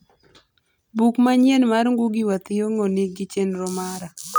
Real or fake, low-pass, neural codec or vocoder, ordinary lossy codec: real; none; none; none